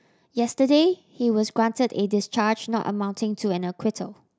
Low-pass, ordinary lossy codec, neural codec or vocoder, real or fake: none; none; none; real